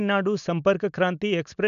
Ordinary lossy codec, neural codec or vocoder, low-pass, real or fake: none; none; 7.2 kHz; real